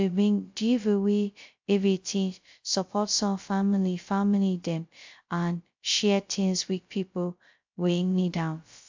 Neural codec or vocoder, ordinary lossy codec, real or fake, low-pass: codec, 16 kHz, 0.2 kbps, FocalCodec; MP3, 64 kbps; fake; 7.2 kHz